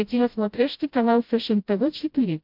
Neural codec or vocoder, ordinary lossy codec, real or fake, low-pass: codec, 16 kHz, 0.5 kbps, FreqCodec, smaller model; MP3, 48 kbps; fake; 5.4 kHz